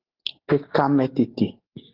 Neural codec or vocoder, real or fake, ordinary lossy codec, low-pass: none; real; Opus, 16 kbps; 5.4 kHz